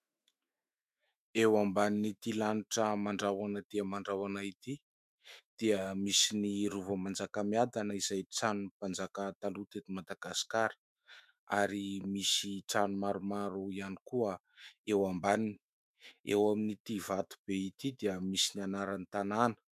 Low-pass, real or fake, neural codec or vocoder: 14.4 kHz; fake; autoencoder, 48 kHz, 128 numbers a frame, DAC-VAE, trained on Japanese speech